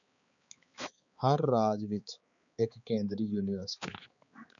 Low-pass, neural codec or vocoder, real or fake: 7.2 kHz; codec, 16 kHz, 4 kbps, X-Codec, HuBERT features, trained on balanced general audio; fake